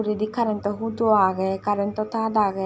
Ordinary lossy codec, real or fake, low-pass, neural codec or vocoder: none; real; none; none